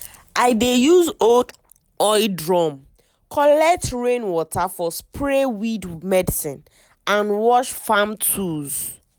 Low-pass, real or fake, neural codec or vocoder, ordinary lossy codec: none; real; none; none